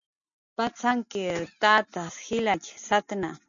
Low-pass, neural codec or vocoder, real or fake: 7.2 kHz; none; real